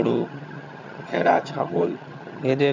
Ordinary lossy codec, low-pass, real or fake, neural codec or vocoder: none; 7.2 kHz; fake; vocoder, 22.05 kHz, 80 mel bands, HiFi-GAN